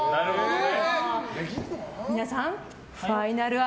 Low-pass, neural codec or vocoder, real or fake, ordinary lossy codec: none; none; real; none